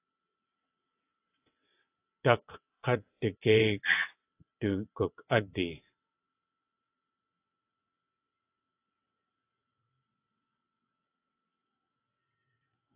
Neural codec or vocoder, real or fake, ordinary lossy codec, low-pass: vocoder, 22.05 kHz, 80 mel bands, WaveNeXt; fake; AAC, 24 kbps; 3.6 kHz